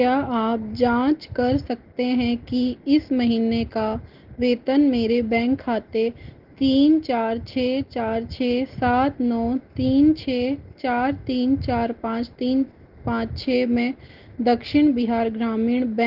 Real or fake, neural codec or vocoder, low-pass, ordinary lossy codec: real; none; 5.4 kHz; Opus, 16 kbps